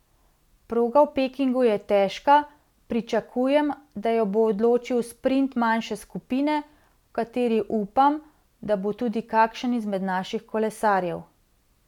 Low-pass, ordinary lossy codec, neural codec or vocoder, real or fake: 19.8 kHz; none; none; real